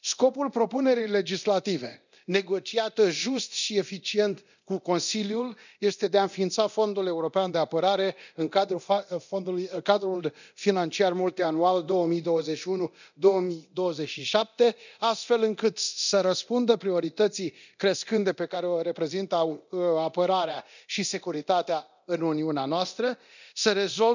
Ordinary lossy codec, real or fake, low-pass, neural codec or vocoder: none; fake; 7.2 kHz; codec, 24 kHz, 0.9 kbps, DualCodec